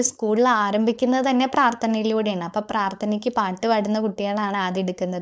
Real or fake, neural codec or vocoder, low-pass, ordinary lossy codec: fake; codec, 16 kHz, 4.8 kbps, FACodec; none; none